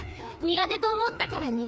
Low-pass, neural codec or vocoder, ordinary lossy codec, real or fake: none; codec, 16 kHz, 2 kbps, FreqCodec, larger model; none; fake